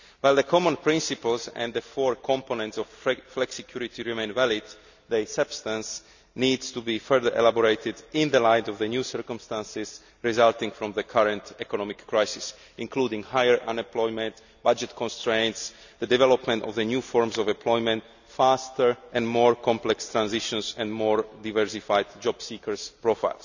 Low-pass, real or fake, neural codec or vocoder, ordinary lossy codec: 7.2 kHz; real; none; none